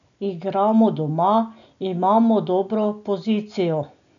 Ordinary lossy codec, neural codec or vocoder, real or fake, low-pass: none; none; real; 7.2 kHz